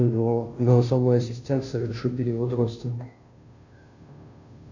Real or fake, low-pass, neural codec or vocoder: fake; 7.2 kHz; codec, 16 kHz, 0.5 kbps, FunCodec, trained on Chinese and English, 25 frames a second